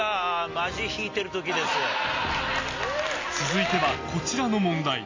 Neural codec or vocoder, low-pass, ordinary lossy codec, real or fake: none; 7.2 kHz; AAC, 32 kbps; real